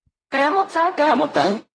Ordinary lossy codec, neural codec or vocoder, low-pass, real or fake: AAC, 32 kbps; codec, 16 kHz in and 24 kHz out, 0.4 kbps, LongCat-Audio-Codec, fine tuned four codebook decoder; 9.9 kHz; fake